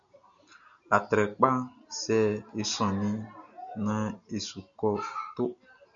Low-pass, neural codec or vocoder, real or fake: 7.2 kHz; none; real